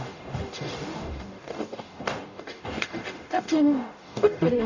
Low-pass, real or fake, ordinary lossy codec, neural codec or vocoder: 7.2 kHz; fake; none; codec, 44.1 kHz, 0.9 kbps, DAC